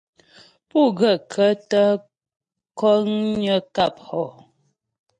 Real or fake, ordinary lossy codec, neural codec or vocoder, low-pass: real; MP3, 48 kbps; none; 9.9 kHz